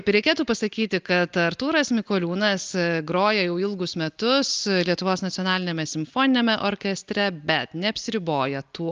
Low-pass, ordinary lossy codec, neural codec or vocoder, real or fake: 7.2 kHz; Opus, 32 kbps; none; real